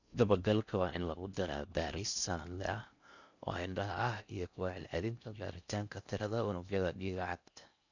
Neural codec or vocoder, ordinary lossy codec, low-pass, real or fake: codec, 16 kHz in and 24 kHz out, 0.6 kbps, FocalCodec, streaming, 4096 codes; none; 7.2 kHz; fake